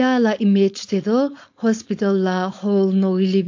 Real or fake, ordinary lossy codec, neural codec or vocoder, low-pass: fake; AAC, 48 kbps; codec, 16 kHz, 4.8 kbps, FACodec; 7.2 kHz